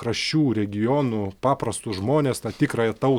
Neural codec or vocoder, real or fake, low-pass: none; real; 19.8 kHz